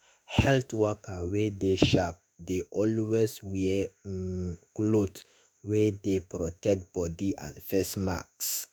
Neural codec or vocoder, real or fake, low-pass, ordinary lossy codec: autoencoder, 48 kHz, 32 numbers a frame, DAC-VAE, trained on Japanese speech; fake; none; none